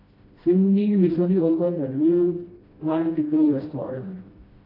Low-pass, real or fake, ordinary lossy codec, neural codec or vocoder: 5.4 kHz; fake; AAC, 24 kbps; codec, 16 kHz, 1 kbps, FreqCodec, smaller model